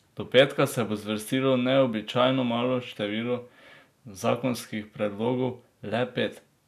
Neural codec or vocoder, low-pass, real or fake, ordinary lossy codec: none; 14.4 kHz; real; none